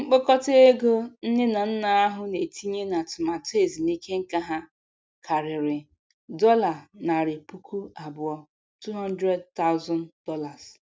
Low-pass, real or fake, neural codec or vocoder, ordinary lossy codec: none; real; none; none